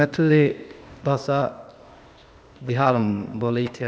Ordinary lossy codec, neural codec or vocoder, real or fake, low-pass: none; codec, 16 kHz, 0.8 kbps, ZipCodec; fake; none